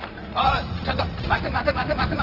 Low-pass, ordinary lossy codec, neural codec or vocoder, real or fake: 5.4 kHz; Opus, 16 kbps; vocoder, 44.1 kHz, 80 mel bands, Vocos; fake